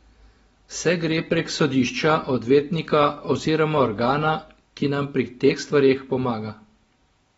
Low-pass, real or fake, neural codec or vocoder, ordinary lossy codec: 10.8 kHz; real; none; AAC, 24 kbps